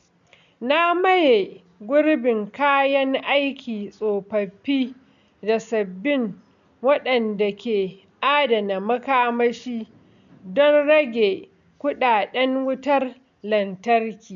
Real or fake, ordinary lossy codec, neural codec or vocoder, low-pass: real; none; none; 7.2 kHz